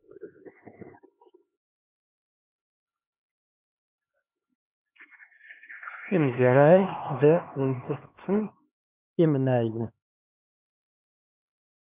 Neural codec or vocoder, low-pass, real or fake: codec, 16 kHz, 2 kbps, X-Codec, HuBERT features, trained on LibriSpeech; 3.6 kHz; fake